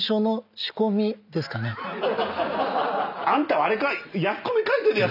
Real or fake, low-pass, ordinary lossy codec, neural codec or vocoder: real; 5.4 kHz; none; none